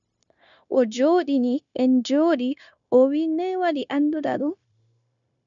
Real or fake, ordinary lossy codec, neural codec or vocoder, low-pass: fake; MP3, 96 kbps; codec, 16 kHz, 0.9 kbps, LongCat-Audio-Codec; 7.2 kHz